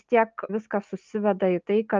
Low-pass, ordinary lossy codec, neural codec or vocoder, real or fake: 7.2 kHz; Opus, 24 kbps; none; real